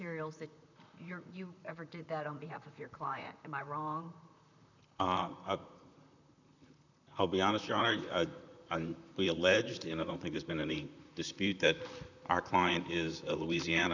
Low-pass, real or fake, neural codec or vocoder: 7.2 kHz; fake; vocoder, 44.1 kHz, 128 mel bands, Pupu-Vocoder